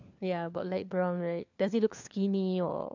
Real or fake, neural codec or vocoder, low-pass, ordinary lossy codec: fake; codec, 16 kHz, 4 kbps, FunCodec, trained on LibriTTS, 50 frames a second; 7.2 kHz; MP3, 64 kbps